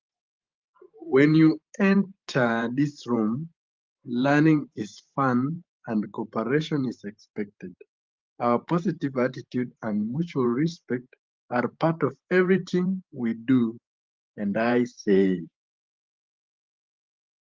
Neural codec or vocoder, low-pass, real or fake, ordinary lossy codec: vocoder, 44.1 kHz, 128 mel bands every 512 samples, BigVGAN v2; 7.2 kHz; fake; Opus, 32 kbps